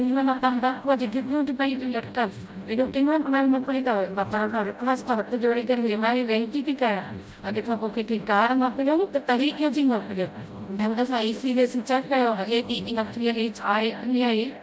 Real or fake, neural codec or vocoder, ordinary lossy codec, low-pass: fake; codec, 16 kHz, 0.5 kbps, FreqCodec, smaller model; none; none